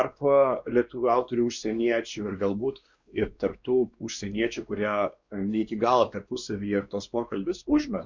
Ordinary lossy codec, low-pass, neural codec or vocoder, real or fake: Opus, 64 kbps; 7.2 kHz; codec, 16 kHz, 2 kbps, X-Codec, WavLM features, trained on Multilingual LibriSpeech; fake